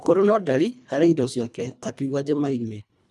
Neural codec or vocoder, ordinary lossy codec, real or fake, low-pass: codec, 24 kHz, 1.5 kbps, HILCodec; none; fake; none